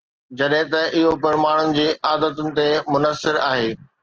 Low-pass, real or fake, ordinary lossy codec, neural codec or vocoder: 7.2 kHz; real; Opus, 16 kbps; none